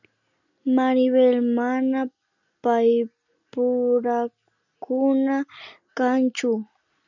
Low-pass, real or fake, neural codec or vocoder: 7.2 kHz; real; none